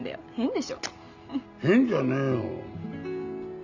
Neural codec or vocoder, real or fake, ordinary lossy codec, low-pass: none; real; none; 7.2 kHz